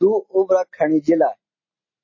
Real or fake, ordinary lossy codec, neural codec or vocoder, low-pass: real; MP3, 32 kbps; none; 7.2 kHz